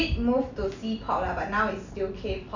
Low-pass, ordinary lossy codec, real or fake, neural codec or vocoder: 7.2 kHz; none; real; none